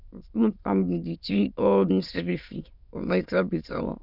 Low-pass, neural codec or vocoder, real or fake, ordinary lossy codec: 5.4 kHz; autoencoder, 22.05 kHz, a latent of 192 numbers a frame, VITS, trained on many speakers; fake; none